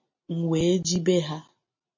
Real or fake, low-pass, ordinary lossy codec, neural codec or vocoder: real; 7.2 kHz; MP3, 32 kbps; none